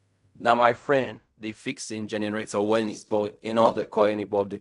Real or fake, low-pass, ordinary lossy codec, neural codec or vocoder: fake; 10.8 kHz; none; codec, 16 kHz in and 24 kHz out, 0.4 kbps, LongCat-Audio-Codec, fine tuned four codebook decoder